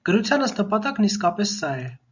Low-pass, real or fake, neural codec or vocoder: 7.2 kHz; real; none